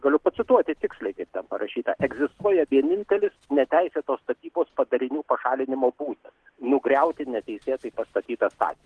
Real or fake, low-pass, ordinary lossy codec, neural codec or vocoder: real; 10.8 kHz; Opus, 16 kbps; none